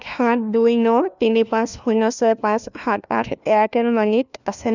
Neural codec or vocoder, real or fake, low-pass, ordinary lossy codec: codec, 16 kHz, 1 kbps, FunCodec, trained on LibriTTS, 50 frames a second; fake; 7.2 kHz; none